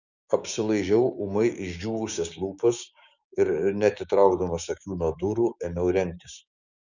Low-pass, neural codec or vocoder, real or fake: 7.2 kHz; codec, 16 kHz, 6 kbps, DAC; fake